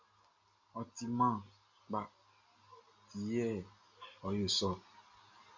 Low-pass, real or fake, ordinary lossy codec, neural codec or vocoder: 7.2 kHz; real; MP3, 48 kbps; none